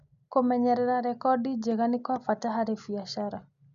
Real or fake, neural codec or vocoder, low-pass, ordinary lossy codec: real; none; 7.2 kHz; none